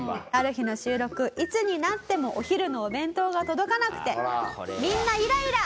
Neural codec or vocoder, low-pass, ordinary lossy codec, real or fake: none; none; none; real